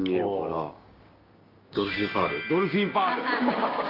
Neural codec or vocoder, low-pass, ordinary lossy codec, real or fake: codec, 16 kHz, 6 kbps, DAC; 5.4 kHz; Opus, 24 kbps; fake